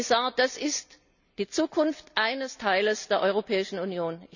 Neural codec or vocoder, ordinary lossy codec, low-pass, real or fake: none; none; 7.2 kHz; real